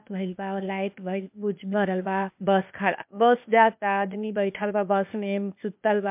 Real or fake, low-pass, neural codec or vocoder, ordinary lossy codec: fake; 3.6 kHz; codec, 16 kHz, 0.8 kbps, ZipCodec; MP3, 32 kbps